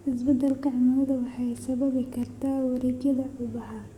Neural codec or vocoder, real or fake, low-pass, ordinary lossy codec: codec, 44.1 kHz, 7.8 kbps, DAC; fake; 19.8 kHz; Opus, 64 kbps